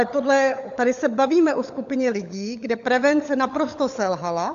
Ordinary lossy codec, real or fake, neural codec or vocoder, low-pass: AAC, 64 kbps; fake; codec, 16 kHz, 16 kbps, FunCodec, trained on LibriTTS, 50 frames a second; 7.2 kHz